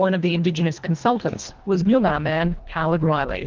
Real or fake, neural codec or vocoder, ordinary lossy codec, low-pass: fake; codec, 24 kHz, 1.5 kbps, HILCodec; Opus, 32 kbps; 7.2 kHz